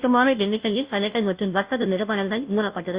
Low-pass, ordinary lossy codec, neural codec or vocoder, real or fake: 3.6 kHz; Opus, 64 kbps; codec, 16 kHz, 0.5 kbps, FunCodec, trained on Chinese and English, 25 frames a second; fake